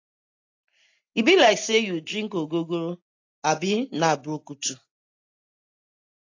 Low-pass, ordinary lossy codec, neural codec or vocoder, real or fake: 7.2 kHz; AAC, 48 kbps; none; real